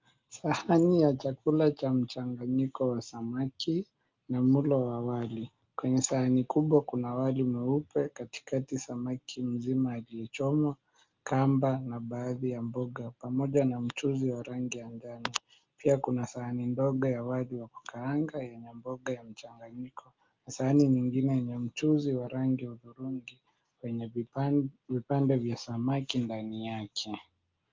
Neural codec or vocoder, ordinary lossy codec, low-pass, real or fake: none; Opus, 32 kbps; 7.2 kHz; real